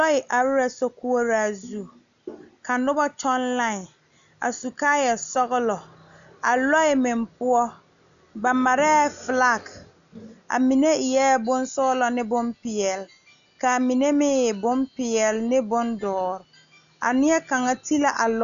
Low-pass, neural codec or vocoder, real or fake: 7.2 kHz; none; real